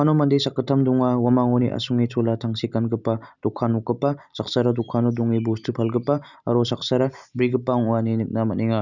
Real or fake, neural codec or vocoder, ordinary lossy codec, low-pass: real; none; none; 7.2 kHz